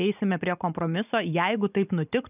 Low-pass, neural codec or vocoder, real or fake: 3.6 kHz; none; real